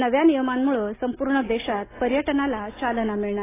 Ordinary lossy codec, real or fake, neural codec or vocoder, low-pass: AAC, 16 kbps; real; none; 3.6 kHz